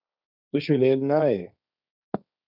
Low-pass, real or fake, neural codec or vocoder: 5.4 kHz; fake; codec, 16 kHz, 1.1 kbps, Voila-Tokenizer